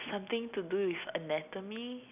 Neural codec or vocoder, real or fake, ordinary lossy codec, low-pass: none; real; none; 3.6 kHz